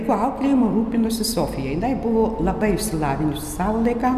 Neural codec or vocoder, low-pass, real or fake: none; 14.4 kHz; real